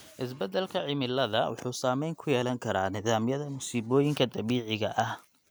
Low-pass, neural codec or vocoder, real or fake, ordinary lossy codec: none; none; real; none